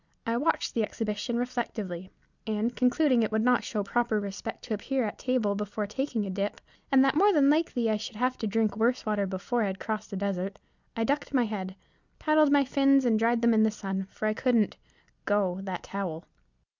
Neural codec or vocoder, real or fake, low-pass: none; real; 7.2 kHz